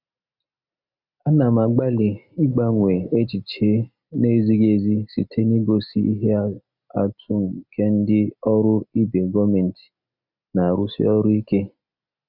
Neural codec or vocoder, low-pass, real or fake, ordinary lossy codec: none; 5.4 kHz; real; none